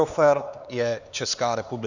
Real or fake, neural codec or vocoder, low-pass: fake; codec, 16 kHz, 4 kbps, X-Codec, HuBERT features, trained on LibriSpeech; 7.2 kHz